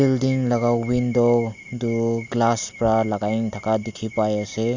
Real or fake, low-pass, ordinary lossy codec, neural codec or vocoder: real; 7.2 kHz; Opus, 64 kbps; none